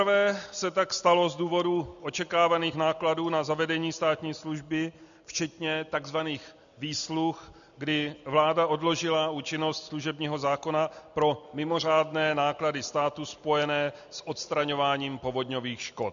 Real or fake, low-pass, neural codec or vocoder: real; 7.2 kHz; none